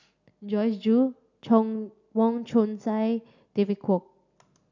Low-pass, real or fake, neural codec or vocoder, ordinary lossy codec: 7.2 kHz; real; none; none